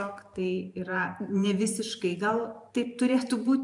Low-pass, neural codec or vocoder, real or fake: 10.8 kHz; vocoder, 44.1 kHz, 128 mel bands every 512 samples, BigVGAN v2; fake